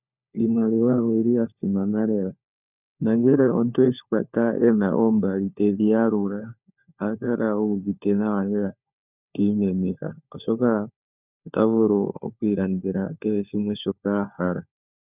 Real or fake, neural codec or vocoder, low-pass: fake; codec, 16 kHz, 4 kbps, FunCodec, trained on LibriTTS, 50 frames a second; 3.6 kHz